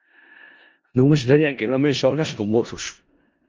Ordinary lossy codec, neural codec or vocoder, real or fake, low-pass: Opus, 24 kbps; codec, 16 kHz in and 24 kHz out, 0.4 kbps, LongCat-Audio-Codec, four codebook decoder; fake; 7.2 kHz